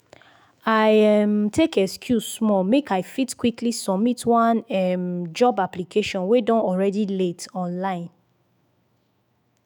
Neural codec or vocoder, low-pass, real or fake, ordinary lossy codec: autoencoder, 48 kHz, 128 numbers a frame, DAC-VAE, trained on Japanese speech; none; fake; none